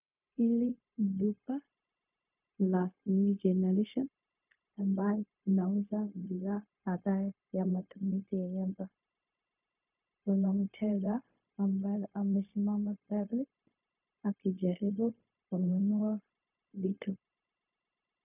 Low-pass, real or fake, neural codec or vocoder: 3.6 kHz; fake; codec, 16 kHz, 0.4 kbps, LongCat-Audio-Codec